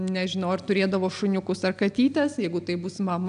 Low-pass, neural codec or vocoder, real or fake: 9.9 kHz; none; real